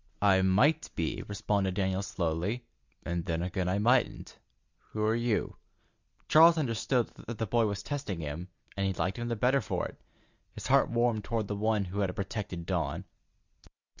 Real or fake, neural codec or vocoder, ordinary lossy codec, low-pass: real; none; Opus, 64 kbps; 7.2 kHz